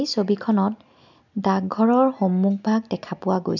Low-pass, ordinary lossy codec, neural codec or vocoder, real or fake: 7.2 kHz; none; none; real